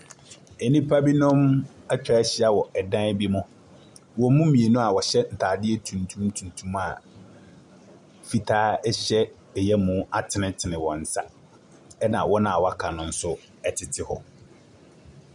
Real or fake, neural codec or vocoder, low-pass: real; none; 10.8 kHz